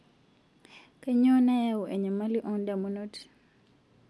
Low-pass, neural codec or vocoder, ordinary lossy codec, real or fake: none; none; none; real